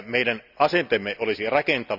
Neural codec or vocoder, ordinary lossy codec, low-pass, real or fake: none; none; 5.4 kHz; real